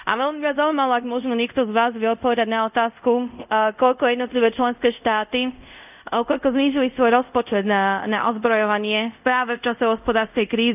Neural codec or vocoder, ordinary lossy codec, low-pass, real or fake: codec, 24 kHz, 0.5 kbps, DualCodec; none; 3.6 kHz; fake